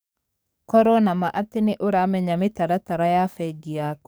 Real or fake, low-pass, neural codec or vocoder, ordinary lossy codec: fake; none; codec, 44.1 kHz, 7.8 kbps, DAC; none